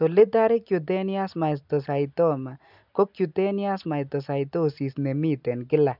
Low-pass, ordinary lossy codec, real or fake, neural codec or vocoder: 5.4 kHz; none; real; none